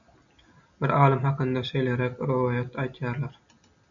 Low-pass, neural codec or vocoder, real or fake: 7.2 kHz; none; real